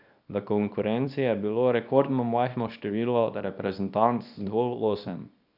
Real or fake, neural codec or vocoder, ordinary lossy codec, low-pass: fake; codec, 24 kHz, 0.9 kbps, WavTokenizer, small release; none; 5.4 kHz